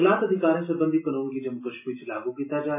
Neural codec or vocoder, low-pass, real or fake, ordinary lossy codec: none; 3.6 kHz; real; MP3, 16 kbps